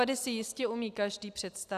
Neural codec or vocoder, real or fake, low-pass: none; real; 14.4 kHz